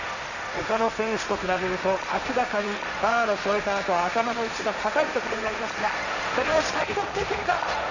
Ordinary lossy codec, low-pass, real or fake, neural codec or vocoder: none; 7.2 kHz; fake; codec, 16 kHz, 1.1 kbps, Voila-Tokenizer